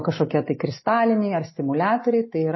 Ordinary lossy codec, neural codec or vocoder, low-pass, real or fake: MP3, 24 kbps; none; 7.2 kHz; real